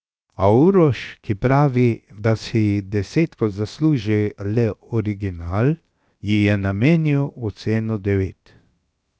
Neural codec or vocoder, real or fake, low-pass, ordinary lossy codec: codec, 16 kHz, 0.7 kbps, FocalCodec; fake; none; none